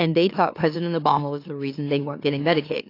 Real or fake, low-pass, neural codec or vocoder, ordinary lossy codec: fake; 5.4 kHz; autoencoder, 44.1 kHz, a latent of 192 numbers a frame, MeloTTS; AAC, 32 kbps